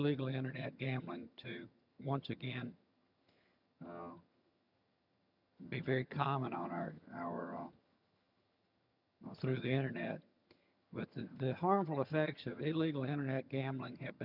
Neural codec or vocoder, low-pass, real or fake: vocoder, 22.05 kHz, 80 mel bands, HiFi-GAN; 5.4 kHz; fake